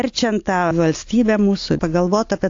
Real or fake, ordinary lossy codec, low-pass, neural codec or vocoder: real; AAC, 48 kbps; 7.2 kHz; none